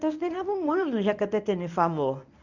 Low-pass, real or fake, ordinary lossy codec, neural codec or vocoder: 7.2 kHz; fake; none; codec, 24 kHz, 0.9 kbps, WavTokenizer, medium speech release version 1